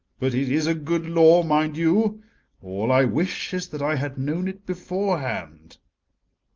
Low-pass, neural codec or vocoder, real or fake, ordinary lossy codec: 7.2 kHz; none; real; Opus, 24 kbps